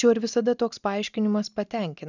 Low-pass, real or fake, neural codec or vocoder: 7.2 kHz; real; none